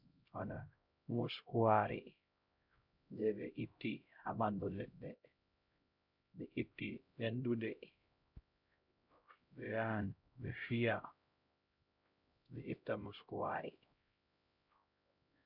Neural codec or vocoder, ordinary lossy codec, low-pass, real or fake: codec, 16 kHz, 0.5 kbps, X-Codec, HuBERT features, trained on LibriSpeech; MP3, 48 kbps; 5.4 kHz; fake